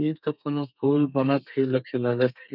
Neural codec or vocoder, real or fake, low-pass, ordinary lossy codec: codec, 32 kHz, 1.9 kbps, SNAC; fake; 5.4 kHz; none